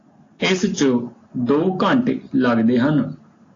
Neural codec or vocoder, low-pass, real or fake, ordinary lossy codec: none; 7.2 kHz; real; AAC, 32 kbps